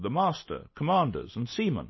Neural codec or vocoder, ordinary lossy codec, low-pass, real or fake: none; MP3, 24 kbps; 7.2 kHz; real